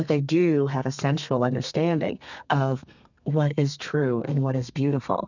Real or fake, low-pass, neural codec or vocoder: fake; 7.2 kHz; codec, 32 kHz, 1.9 kbps, SNAC